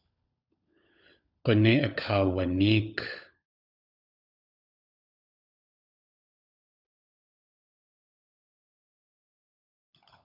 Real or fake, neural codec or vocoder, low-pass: fake; codec, 16 kHz, 16 kbps, FunCodec, trained on LibriTTS, 50 frames a second; 5.4 kHz